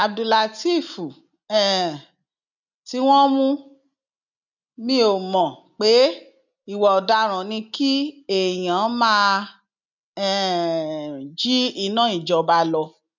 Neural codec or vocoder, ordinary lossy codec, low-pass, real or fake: none; none; 7.2 kHz; real